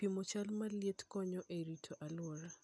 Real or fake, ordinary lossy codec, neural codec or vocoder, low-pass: real; none; none; none